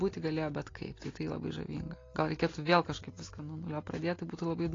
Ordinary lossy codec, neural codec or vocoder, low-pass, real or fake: AAC, 32 kbps; none; 7.2 kHz; real